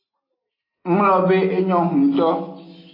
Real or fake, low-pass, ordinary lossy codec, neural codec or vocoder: real; 5.4 kHz; AAC, 24 kbps; none